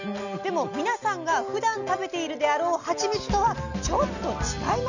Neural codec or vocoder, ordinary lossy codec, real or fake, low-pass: none; none; real; 7.2 kHz